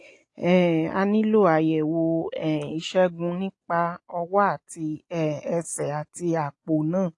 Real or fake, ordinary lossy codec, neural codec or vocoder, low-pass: real; AAC, 48 kbps; none; 10.8 kHz